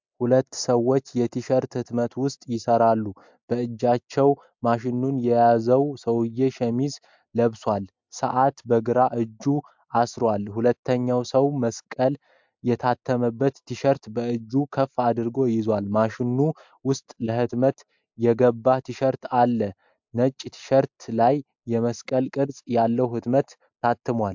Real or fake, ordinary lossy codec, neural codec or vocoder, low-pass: real; MP3, 64 kbps; none; 7.2 kHz